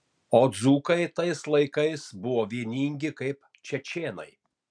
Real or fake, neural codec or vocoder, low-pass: real; none; 9.9 kHz